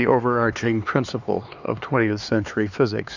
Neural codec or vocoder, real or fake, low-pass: codec, 16 kHz, 4 kbps, X-Codec, HuBERT features, trained on LibriSpeech; fake; 7.2 kHz